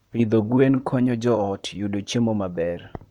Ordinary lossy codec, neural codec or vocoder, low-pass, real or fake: Opus, 64 kbps; vocoder, 44.1 kHz, 128 mel bands, Pupu-Vocoder; 19.8 kHz; fake